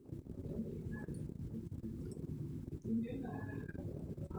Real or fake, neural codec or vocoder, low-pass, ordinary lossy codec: fake; vocoder, 44.1 kHz, 128 mel bands, Pupu-Vocoder; none; none